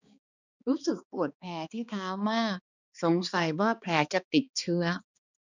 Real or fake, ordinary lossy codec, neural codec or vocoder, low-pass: fake; none; codec, 16 kHz, 2 kbps, X-Codec, HuBERT features, trained on balanced general audio; 7.2 kHz